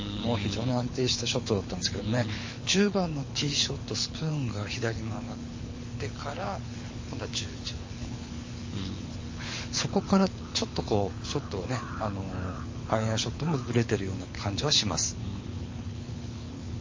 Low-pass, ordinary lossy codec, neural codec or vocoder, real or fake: 7.2 kHz; MP3, 32 kbps; codec, 24 kHz, 6 kbps, HILCodec; fake